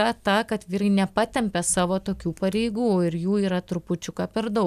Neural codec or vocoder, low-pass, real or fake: none; 14.4 kHz; real